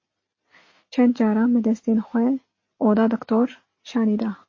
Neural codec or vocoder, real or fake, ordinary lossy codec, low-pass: none; real; MP3, 32 kbps; 7.2 kHz